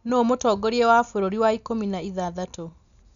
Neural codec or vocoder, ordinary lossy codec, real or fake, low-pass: none; none; real; 7.2 kHz